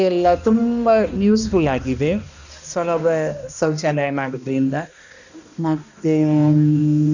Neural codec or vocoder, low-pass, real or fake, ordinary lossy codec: codec, 16 kHz, 1 kbps, X-Codec, HuBERT features, trained on balanced general audio; 7.2 kHz; fake; none